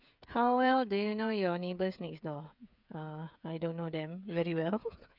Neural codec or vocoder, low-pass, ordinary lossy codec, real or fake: codec, 16 kHz, 8 kbps, FreqCodec, smaller model; 5.4 kHz; none; fake